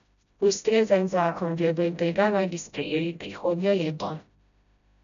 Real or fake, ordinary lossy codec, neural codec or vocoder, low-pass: fake; none; codec, 16 kHz, 0.5 kbps, FreqCodec, smaller model; 7.2 kHz